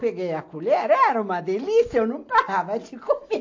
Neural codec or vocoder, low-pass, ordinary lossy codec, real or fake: none; 7.2 kHz; AAC, 48 kbps; real